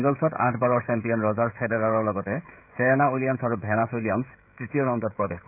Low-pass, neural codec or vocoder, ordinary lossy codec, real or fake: 3.6 kHz; codec, 16 kHz, 16 kbps, FreqCodec, smaller model; none; fake